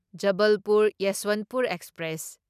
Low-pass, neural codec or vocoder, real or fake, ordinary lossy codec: 14.4 kHz; none; real; none